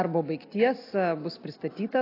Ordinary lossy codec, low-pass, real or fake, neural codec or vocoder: AAC, 32 kbps; 5.4 kHz; real; none